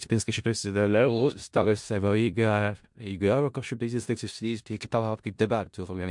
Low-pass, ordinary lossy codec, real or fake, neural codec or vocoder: 10.8 kHz; MP3, 64 kbps; fake; codec, 16 kHz in and 24 kHz out, 0.4 kbps, LongCat-Audio-Codec, four codebook decoder